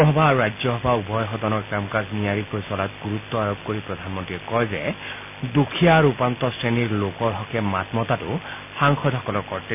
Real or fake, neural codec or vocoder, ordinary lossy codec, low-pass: real; none; none; 3.6 kHz